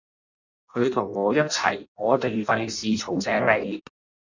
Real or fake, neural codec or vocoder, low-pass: fake; codec, 16 kHz in and 24 kHz out, 0.6 kbps, FireRedTTS-2 codec; 7.2 kHz